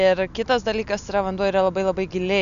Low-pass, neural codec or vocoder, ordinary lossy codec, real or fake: 7.2 kHz; none; AAC, 96 kbps; real